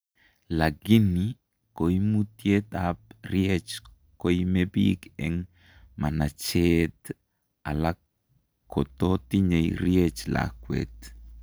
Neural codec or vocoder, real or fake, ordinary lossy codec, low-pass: none; real; none; none